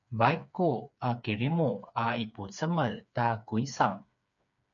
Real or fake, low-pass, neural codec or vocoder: fake; 7.2 kHz; codec, 16 kHz, 4 kbps, FreqCodec, smaller model